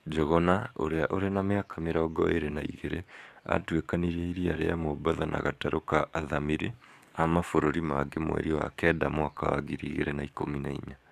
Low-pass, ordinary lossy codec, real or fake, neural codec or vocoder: 14.4 kHz; AAC, 96 kbps; fake; codec, 44.1 kHz, 7.8 kbps, DAC